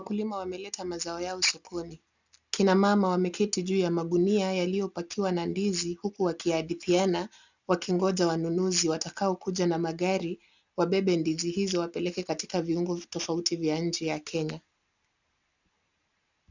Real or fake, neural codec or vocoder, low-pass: real; none; 7.2 kHz